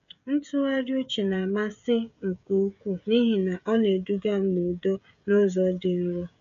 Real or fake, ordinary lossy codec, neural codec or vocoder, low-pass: fake; MP3, 64 kbps; codec, 16 kHz, 16 kbps, FreqCodec, smaller model; 7.2 kHz